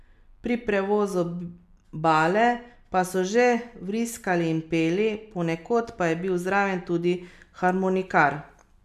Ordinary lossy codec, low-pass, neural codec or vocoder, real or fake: none; 14.4 kHz; none; real